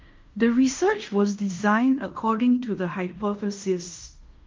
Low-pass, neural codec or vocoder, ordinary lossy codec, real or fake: 7.2 kHz; codec, 16 kHz in and 24 kHz out, 0.9 kbps, LongCat-Audio-Codec, fine tuned four codebook decoder; Opus, 32 kbps; fake